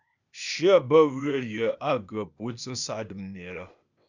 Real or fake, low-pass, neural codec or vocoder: fake; 7.2 kHz; codec, 16 kHz, 0.8 kbps, ZipCodec